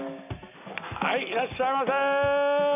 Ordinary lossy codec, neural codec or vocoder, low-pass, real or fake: none; none; 3.6 kHz; real